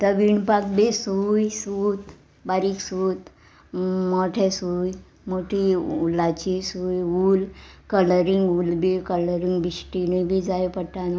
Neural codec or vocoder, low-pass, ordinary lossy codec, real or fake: none; none; none; real